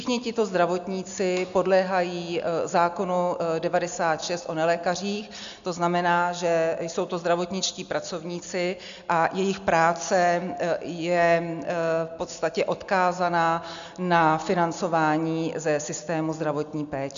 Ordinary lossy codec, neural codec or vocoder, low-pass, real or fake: MP3, 64 kbps; none; 7.2 kHz; real